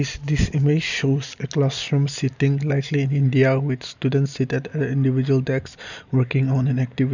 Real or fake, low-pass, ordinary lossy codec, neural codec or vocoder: real; 7.2 kHz; none; none